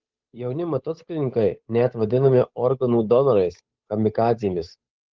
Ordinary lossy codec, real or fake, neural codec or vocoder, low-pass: Opus, 24 kbps; fake; codec, 16 kHz, 8 kbps, FunCodec, trained on Chinese and English, 25 frames a second; 7.2 kHz